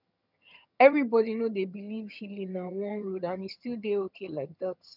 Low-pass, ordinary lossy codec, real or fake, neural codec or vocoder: 5.4 kHz; none; fake; vocoder, 22.05 kHz, 80 mel bands, HiFi-GAN